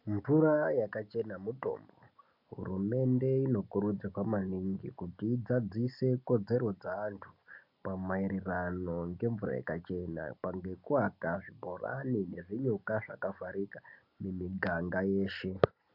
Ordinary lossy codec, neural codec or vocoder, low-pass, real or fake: MP3, 48 kbps; none; 5.4 kHz; real